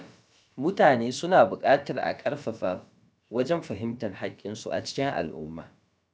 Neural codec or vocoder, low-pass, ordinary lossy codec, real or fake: codec, 16 kHz, about 1 kbps, DyCAST, with the encoder's durations; none; none; fake